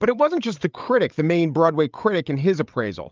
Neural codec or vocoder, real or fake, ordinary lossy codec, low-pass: codec, 16 kHz, 8 kbps, FreqCodec, larger model; fake; Opus, 24 kbps; 7.2 kHz